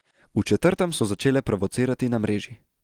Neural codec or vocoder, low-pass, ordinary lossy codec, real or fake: none; 19.8 kHz; Opus, 24 kbps; real